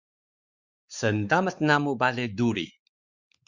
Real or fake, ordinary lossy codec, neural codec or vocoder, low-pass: fake; Opus, 64 kbps; codec, 16 kHz, 4 kbps, X-Codec, WavLM features, trained on Multilingual LibriSpeech; 7.2 kHz